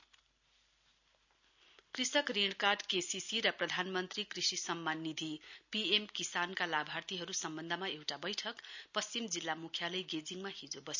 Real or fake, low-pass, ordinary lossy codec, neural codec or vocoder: real; 7.2 kHz; none; none